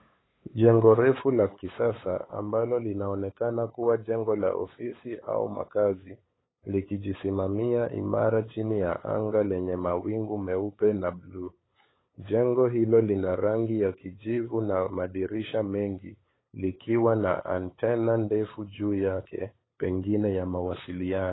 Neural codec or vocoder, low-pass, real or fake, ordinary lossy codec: codec, 16 kHz, 8 kbps, FunCodec, trained on LibriTTS, 25 frames a second; 7.2 kHz; fake; AAC, 16 kbps